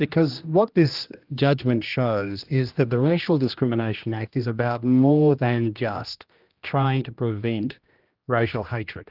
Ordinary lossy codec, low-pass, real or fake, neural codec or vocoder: Opus, 32 kbps; 5.4 kHz; fake; codec, 16 kHz, 1 kbps, X-Codec, HuBERT features, trained on general audio